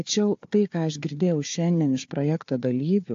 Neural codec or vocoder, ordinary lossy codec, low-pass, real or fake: codec, 16 kHz, 4 kbps, FunCodec, trained on Chinese and English, 50 frames a second; AAC, 48 kbps; 7.2 kHz; fake